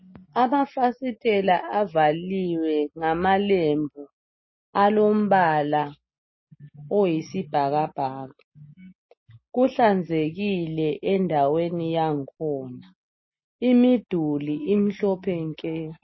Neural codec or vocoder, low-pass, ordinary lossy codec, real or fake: none; 7.2 kHz; MP3, 24 kbps; real